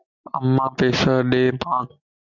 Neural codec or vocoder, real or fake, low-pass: none; real; 7.2 kHz